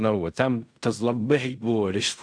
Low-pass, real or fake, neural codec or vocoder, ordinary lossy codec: 9.9 kHz; fake; codec, 16 kHz in and 24 kHz out, 0.4 kbps, LongCat-Audio-Codec, fine tuned four codebook decoder; AAC, 64 kbps